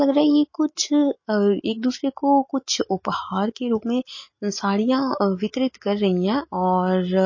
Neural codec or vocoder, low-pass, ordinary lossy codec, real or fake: none; 7.2 kHz; MP3, 32 kbps; real